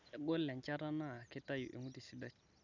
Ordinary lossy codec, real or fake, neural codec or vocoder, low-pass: none; real; none; 7.2 kHz